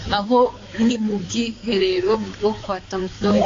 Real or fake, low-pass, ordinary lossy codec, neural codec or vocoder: fake; 7.2 kHz; AAC, 32 kbps; codec, 16 kHz, 4 kbps, X-Codec, HuBERT features, trained on balanced general audio